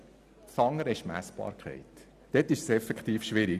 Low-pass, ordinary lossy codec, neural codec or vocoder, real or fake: 14.4 kHz; none; none; real